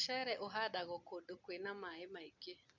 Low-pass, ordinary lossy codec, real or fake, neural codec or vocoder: 7.2 kHz; none; real; none